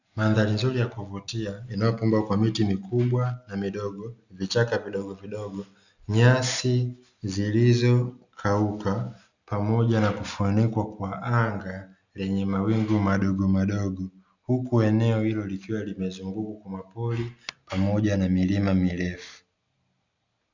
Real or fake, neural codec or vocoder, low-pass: real; none; 7.2 kHz